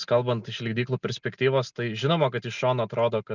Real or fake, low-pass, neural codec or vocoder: real; 7.2 kHz; none